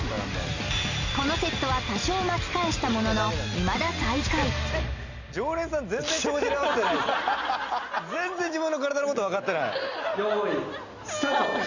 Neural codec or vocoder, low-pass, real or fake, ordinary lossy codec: none; 7.2 kHz; real; Opus, 64 kbps